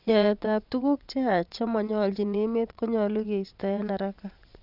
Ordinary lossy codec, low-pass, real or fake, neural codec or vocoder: none; 5.4 kHz; fake; vocoder, 22.05 kHz, 80 mel bands, WaveNeXt